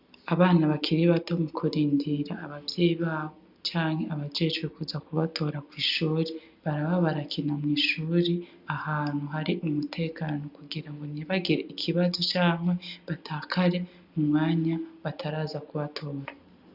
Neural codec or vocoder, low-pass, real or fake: none; 5.4 kHz; real